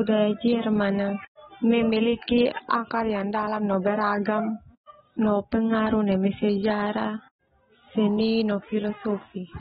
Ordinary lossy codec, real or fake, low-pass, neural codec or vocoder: AAC, 16 kbps; real; 19.8 kHz; none